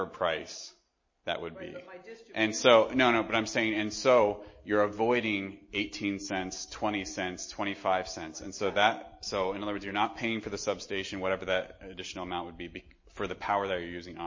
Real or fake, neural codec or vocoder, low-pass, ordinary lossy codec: real; none; 7.2 kHz; MP3, 32 kbps